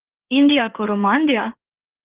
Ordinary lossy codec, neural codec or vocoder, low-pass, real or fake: Opus, 16 kbps; codec, 16 kHz in and 24 kHz out, 2.2 kbps, FireRedTTS-2 codec; 3.6 kHz; fake